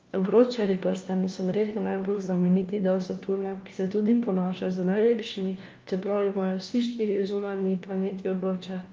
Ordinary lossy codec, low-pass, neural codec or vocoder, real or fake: Opus, 32 kbps; 7.2 kHz; codec, 16 kHz, 1 kbps, FunCodec, trained on LibriTTS, 50 frames a second; fake